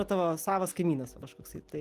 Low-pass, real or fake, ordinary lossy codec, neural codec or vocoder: 14.4 kHz; real; Opus, 24 kbps; none